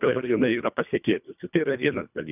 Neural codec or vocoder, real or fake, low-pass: codec, 24 kHz, 1.5 kbps, HILCodec; fake; 3.6 kHz